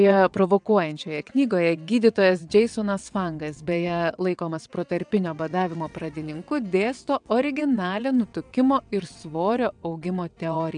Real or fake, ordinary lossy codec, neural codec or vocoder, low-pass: fake; MP3, 96 kbps; vocoder, 22.05 kHz, 80 mel bands, WaveNeXt; 9.9 kHz